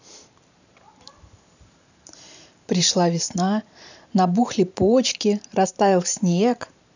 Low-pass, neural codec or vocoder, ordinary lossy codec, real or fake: 7.2 kHz; vocoder, 44.1 kHz, 128 mel bands every 512 samples, BigVGAN v2; none; fake